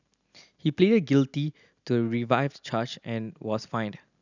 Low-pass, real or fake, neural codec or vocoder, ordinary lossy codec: 7.2 kHz; real; none; none